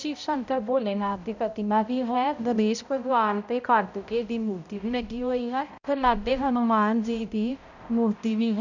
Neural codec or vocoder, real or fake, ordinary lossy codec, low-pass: codec, 16 kHz, 0.5 kbps, X-Codec, HuBERT features, trained on balanced general audio; fake; none; 7.2 kHz